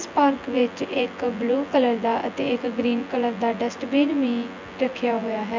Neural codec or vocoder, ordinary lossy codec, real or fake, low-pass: vocoder, 24 kHz, 100 mel bands, Vocos; MP3, 64 kbps; fake; 7.2 kHz